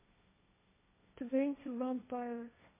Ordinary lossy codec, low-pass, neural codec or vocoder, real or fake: MP3, 16 kbps; 3.6 kHz; codec, 16 kHz, 1.1 kbps, Voila-Tokenizer; fake